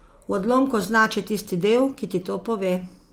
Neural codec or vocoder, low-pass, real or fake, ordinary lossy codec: none; 19.8 kHz; real; Opus, 24 kbps